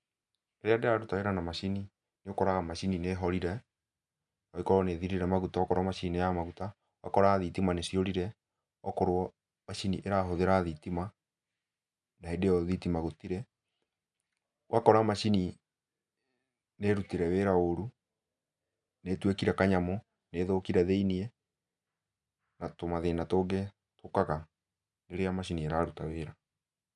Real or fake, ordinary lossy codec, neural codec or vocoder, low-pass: real; none; none; 10.8 kHz